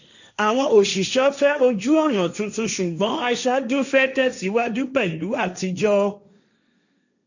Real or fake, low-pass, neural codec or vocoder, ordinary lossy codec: fake; 7.2 kHz; codec, 16 kHz, 1.1 kbps, Voila-Tokenizer; none